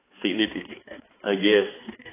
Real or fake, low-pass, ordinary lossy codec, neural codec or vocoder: fake; 3.6 kHz; AAC, 16 kbps; codec, 16 kHz, 4 kbps, X-Codec, HuBERT features, trained on balanced general audio